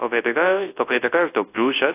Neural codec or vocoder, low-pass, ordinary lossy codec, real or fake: codec, 24 kHz, 0.9 kbps, WavTokenizer, large speech release; 3.6 kHz; AAC, 24 kbps; fake